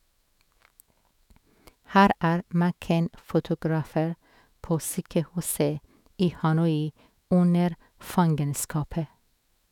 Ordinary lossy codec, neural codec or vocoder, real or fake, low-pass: none; autoencoder, 48 kHz, 128 numbers a frame, DAC-VAE, trained on Japanese speech; fake; 19.8 kHz